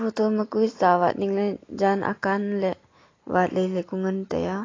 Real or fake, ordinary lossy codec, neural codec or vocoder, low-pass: real; AAC, 32 kbps; none; 7.2 kHz